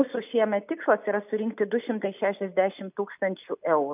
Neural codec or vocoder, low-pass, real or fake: none; 3.6 kHz; real